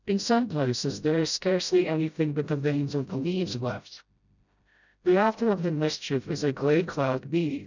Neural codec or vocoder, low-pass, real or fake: codec, 16 kHz, 0.5 kbps, FreqCodec, smaller model; 7.2 kHz; fake